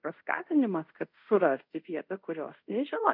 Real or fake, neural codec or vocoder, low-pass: fake; codec, 24 kHz, 0.5 kbps, DualCodec; 5.4 kHz